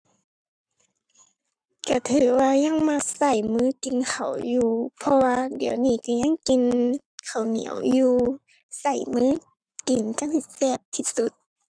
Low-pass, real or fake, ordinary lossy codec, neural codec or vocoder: 9.9 kHz; fake; none; codec, 44.1 kHz, 7.8 kbps, Pupu-Codec